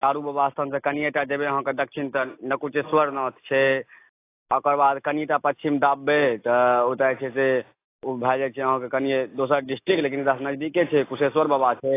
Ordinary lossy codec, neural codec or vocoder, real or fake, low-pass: AAC, 24 kbps; none; real; 3.6 kHz